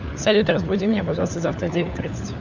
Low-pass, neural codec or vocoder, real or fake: 7.2 kHz; codec, 16 kHz, 4 kbps, FunCodec, trained on LibriTTS, 50 frames a second; fake